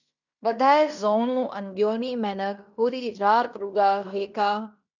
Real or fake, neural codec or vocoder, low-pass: fake; codec, 16 kHz in and 24 kHz out, 0.9 kbps, LongCat-Audio-Codec, fine tuned four codebook decoder; 7.2 kHz